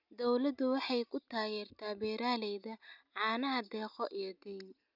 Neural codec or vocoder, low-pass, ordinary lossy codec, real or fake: none; 5.4 kHz; none; real